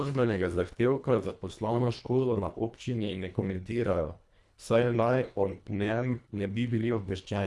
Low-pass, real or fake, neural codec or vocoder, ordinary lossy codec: none; fake; codec, 24 kHz, 1.5 kbps, HILCodec; none